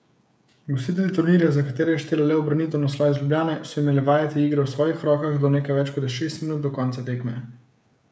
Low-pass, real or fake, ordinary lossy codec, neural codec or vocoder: none; fake; none; codec, 16 kHz, 16 kbps, FreqCodec, smaller model